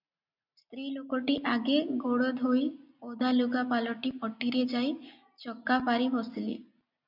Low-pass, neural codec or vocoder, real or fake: 5.4 kHz; none; real